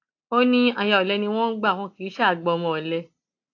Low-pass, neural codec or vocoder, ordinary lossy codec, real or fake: 7.2 kHz; none; AAC, 48 kbps; real